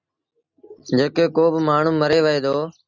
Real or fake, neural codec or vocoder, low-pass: real; none; 7.2 kHz